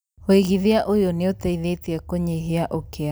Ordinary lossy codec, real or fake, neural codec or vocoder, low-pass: none; real; none; none